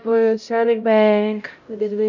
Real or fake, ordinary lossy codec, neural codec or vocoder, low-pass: fake; none; codec, 16 kHz, 0.5 kbps, X-Codec, HuBERT features, trained on LibriSpeech; 7.2 kHz